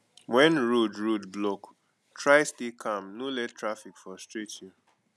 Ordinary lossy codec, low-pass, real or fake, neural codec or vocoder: none; none; real; none